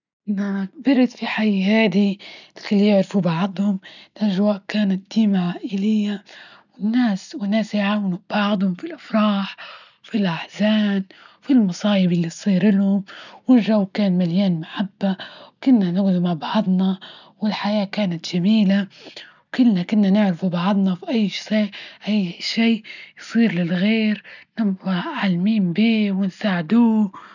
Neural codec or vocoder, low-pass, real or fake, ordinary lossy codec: none; 7.2 kHz; real; none